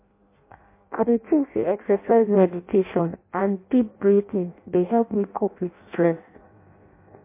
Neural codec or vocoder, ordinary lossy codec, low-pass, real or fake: codec, 16 kHz in and 24 kHz out, 0.6 kbps, FireRedTTS-2 codec; MP3, 24 kbps; 3.6 kHz; fake